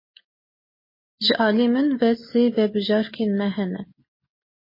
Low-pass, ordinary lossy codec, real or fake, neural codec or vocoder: 5.4 kHz; MP3, 24 kbps; real; none